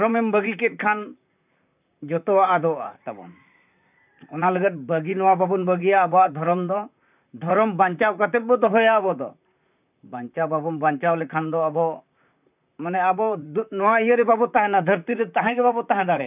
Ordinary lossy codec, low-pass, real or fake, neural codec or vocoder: none; 3.6 kHz; fake; vocoder, 44.1 kHz, 128 mel bands, Pupu-Vocoder